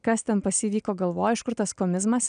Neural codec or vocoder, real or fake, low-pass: none; real; 9.9 kHz